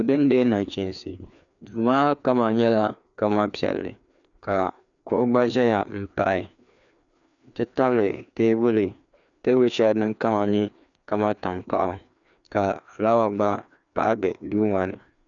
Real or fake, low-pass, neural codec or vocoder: fake; 7.2 kHz; codec, 16 kHz, 2 kbps, FreqCodec, larger model